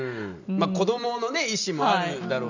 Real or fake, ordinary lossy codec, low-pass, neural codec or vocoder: real; none; 7.2 kHz; none